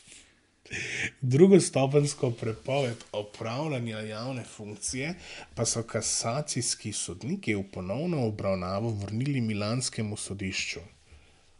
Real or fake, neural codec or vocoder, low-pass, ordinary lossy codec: real; none; 10.8 kHz; MP3, 96 kbps